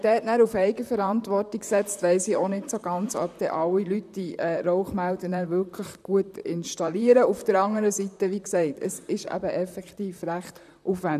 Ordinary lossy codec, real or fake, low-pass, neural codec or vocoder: none; fake; 14.4 kHz; vocoder, 44.1 kHz, 128 mel bands, Pupu-Vocoder